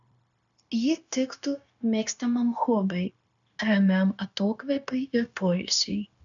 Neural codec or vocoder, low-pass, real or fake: codec, 16 kHz, 0.9 kbps, LongCat-Audio-Codec; 7.2 kHz; fake